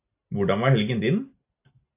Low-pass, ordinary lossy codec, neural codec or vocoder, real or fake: 3.6 kHz; AAC, 32 kbps; none; real